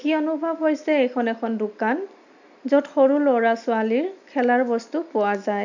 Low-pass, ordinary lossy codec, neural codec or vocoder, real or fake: 7.2 kHz; none; none; real